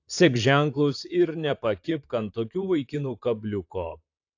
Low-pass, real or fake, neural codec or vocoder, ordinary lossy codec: 7.2 kHz; fake; vocoder, 44.1 kHz, 128 mel bands, Pupu-Vocoder; AAC, 48 kbps